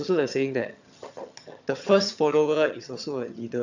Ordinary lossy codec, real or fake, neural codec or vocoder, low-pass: none; fake; vocoder, 22.05 kHz, 80 mel bands, HiFi-GAN; 7.2 kHz